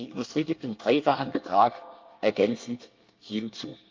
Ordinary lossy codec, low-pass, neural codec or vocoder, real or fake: Opus, 32 kbps; 7.2 kHz; codec, 24 kHz, 1 kbps, SNAC; fake